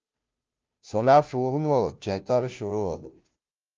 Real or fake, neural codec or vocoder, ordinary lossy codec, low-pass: fake; codec, 16 kHz, 0.5 kbps, FunCodec, trained on Chinese and English, 25 frames a second; Opus, 24 kbps; 7.2 kHz